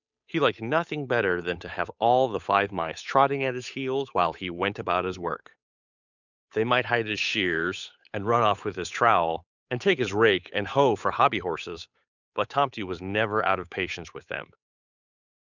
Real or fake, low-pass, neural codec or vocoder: fake; 7.2 kHz; codec, 16 kHz, 8 kbps, FunCodec, trained on Chinese and English, 25 frames a second